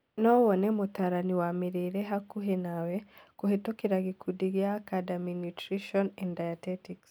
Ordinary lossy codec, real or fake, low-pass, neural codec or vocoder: none; real; none; none